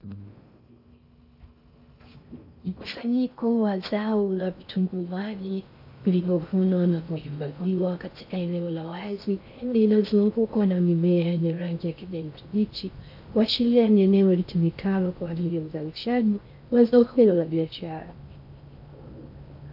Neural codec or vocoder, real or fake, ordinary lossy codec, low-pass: codec, 16 kHz in and 24 kHz out, 0.6 kbps, FocalCodec, streaming, 4096 codes; fake; AAC, 48 kbps; 5.4 kHz